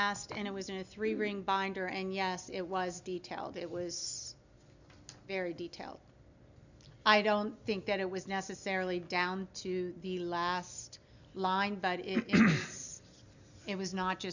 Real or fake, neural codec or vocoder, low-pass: real; none; 7.2 kHz